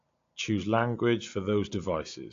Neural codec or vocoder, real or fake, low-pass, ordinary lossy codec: none; real; 7.2 kHz; AAC, 48 kbps